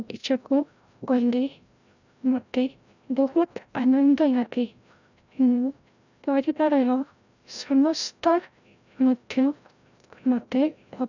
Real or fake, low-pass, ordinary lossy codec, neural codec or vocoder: fake; 7.2 kHz; none; codec, 16 kHz, 0.5 kbps, FreqCodec, larger model